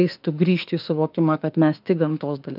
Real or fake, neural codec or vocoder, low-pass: fake; autoencoder, 48 kHz, 32 numbers a frame, DAC-VAE, trained on Japanese speech; 5.4 kHz